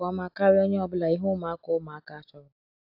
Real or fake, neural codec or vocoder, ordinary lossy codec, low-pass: real; none; AAC, 48 kbps; 5.4 kHz